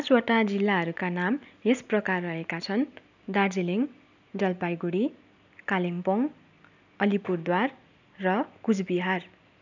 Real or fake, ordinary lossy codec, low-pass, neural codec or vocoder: real; none; 7.2 kHz; none